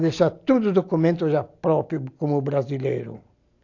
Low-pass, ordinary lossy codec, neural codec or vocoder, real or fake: 7.2 kHz; AAC, 48 kbps; none; real